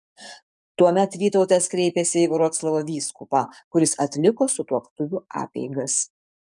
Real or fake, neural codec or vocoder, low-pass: fake; codec, 44.1 kHz, 7.8 kbps, DAC; 10.8 kHz